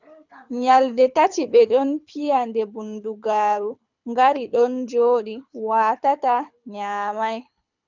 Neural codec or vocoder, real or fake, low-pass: codec, 24 kHz, 6 kbps, HILCodec; fake; 7.2 kHz